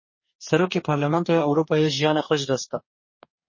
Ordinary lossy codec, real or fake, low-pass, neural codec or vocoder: MP3, 32 kbps; fake; 7.2 kHz; codec, 44.1 kHz, 2.6 kbps, DAC